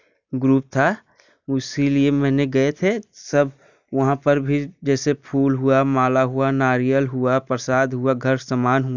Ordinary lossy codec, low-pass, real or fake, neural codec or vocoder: none; 7.2 kHz; real; none